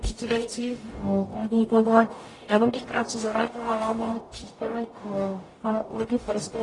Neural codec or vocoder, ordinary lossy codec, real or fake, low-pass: codec, 44.1 kHz, 0.9 kbps, DAC; AAC, 32 kbps; fake; 10.8 kHz